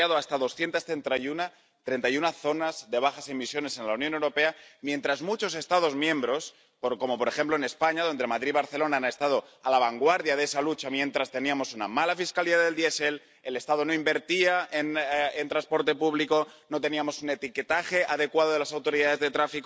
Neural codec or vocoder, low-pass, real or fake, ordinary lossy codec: none; none; real; none